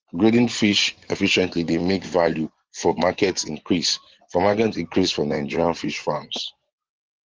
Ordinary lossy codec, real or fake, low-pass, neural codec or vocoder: Opus, 16 kbps; fake; 7.2 kHz; vocoder, 24 kHz, 100 mel bands, Vocos